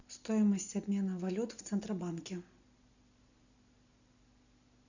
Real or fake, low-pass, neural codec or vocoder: real; 7.2 kHz; none